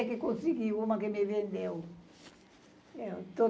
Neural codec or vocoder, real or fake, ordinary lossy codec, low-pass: none; real; none; none